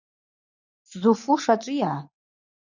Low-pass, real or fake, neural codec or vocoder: 7.2 kHz; real; none